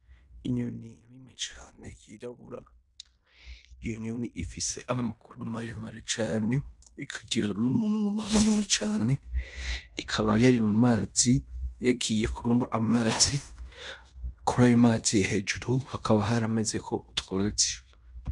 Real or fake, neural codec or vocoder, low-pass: fake; codec, 16 kHz in and 24 kHz out, 0.9 kbps, LongCat-Audio-Codec, fine tuned four codebook decoder; 10.8 kHz